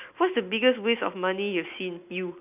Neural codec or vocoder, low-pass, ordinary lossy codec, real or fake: none; 3.6 kHz; none; real